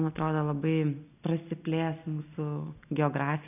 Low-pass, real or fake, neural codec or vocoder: 3.6 kHz; real; none